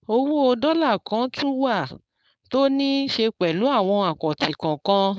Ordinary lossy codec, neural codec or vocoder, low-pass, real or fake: none; codec, 16 kHz, 4.8 kbps, FACodec; none; fake